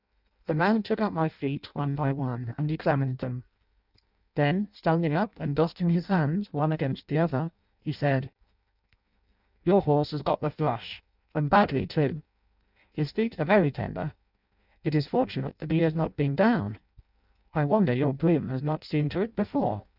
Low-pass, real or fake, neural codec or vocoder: 5.4 kHz; fake; codec, 16 kHz in and 24 kHz out, 0.6 kbps, FireRedTTS-2 codec